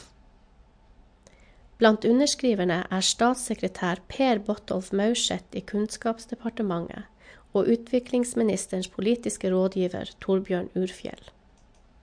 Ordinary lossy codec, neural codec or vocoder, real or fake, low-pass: MP3, 64 kbps; none; real; 9.9 kHz